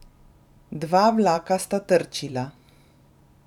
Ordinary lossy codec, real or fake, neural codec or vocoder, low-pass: none; fake; vocoder, 44.1 kHz, 128 mel bands every 512 samples, BigVGAN v2; 19.8 kHz